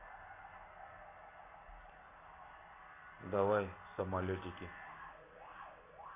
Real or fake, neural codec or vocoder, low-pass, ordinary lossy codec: real; none; 3.6 kHz; AAC, 16 kbps